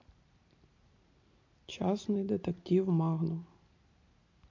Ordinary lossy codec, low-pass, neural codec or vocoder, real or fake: MP3, 48 kbps; 7.2 kHz; none; real